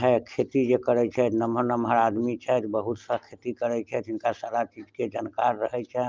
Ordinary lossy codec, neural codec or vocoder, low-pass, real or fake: Opus, 32 kbps; none; 7.2 kHz; real